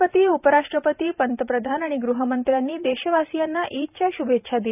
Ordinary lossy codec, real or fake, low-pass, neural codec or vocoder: none; fake; 3.6 kHz; vocoder, 44.1 kHz, 128 mel bands every 512 samples, BigVGAN v2